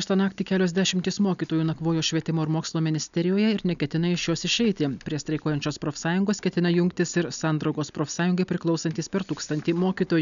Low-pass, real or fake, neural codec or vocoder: 7.2 kHz; real; none